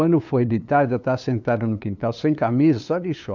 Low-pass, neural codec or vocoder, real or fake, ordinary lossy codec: 7.2 kHz; codec, 16 kHz, 2 kbps, FunCodec, trained on LibriTTS, 25 frames a second; fake; none